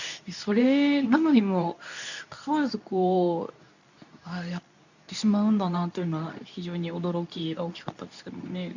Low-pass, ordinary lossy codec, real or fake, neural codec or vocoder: 7.2 kHz; none; fake; codec, 24 kHz, 0.9 kbps, WavTokenizer, medium speech release version 2